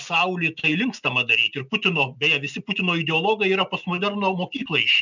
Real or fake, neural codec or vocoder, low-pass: real; none; 7.2 kHz